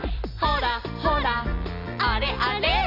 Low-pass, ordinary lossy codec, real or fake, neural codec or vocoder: 5.4 kHz; none; real; none